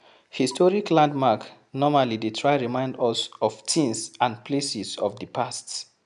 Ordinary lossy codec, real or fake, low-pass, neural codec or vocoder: none; real; 10.8 kHz; none